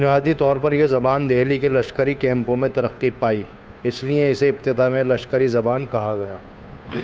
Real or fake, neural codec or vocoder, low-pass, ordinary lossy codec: fake; codec, 16 kHz, 2 kbps, FunCodec, trained on Chinese and English, 25 frames a second; none; none